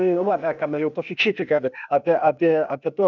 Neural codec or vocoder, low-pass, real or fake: codec, 16 kHz, 0.8 kbps, ZipCodec; 7.2 kHz; fake